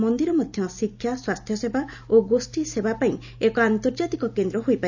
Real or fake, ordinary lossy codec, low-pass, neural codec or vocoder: real; none; 7.2 kHz; none